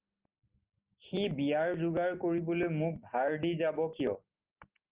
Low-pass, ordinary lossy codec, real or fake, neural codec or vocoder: 3.6 kHz; Opus, 24 kbps; real; none